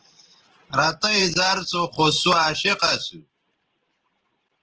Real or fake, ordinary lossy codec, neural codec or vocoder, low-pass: fake; Opus, 16 kbps; vocoder, 44.1 kHz, 128 mel bands every 512 samples, BigVGAN v2; 7.2 kHz